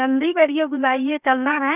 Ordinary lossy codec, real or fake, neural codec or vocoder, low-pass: none; fake; codec, 16 kHz, 0.7 kbps, FocalCodec; 3.6 kHz